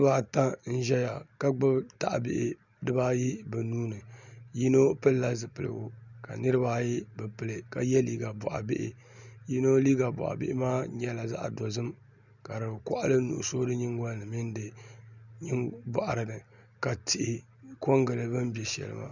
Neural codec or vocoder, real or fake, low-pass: none; real; 7.2 kHz